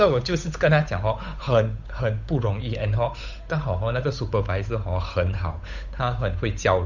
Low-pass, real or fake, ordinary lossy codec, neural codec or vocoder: 7.2 kHz; fake; none; codec, 16 kHz, 8 kbps, FunCodec, trained on Chinese and English, 25 frames a second